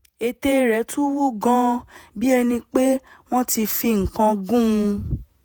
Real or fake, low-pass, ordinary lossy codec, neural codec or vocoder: fake; none; none; vocoder, 48 kHz, 128 mel bands, Vocos